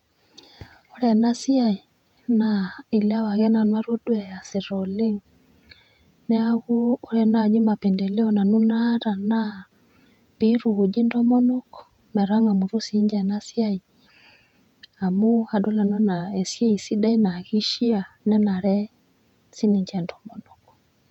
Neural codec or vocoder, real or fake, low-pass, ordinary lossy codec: vocoder, 48 kHz, 128 mel bands, Vocos; fake; 19.8 kHz; none